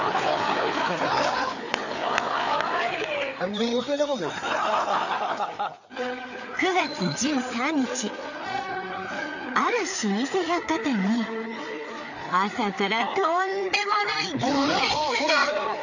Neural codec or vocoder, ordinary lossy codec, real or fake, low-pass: codec, 16 kHz, 4 kbps, FreqCodec, larger model; none; fake; 7.2 kHz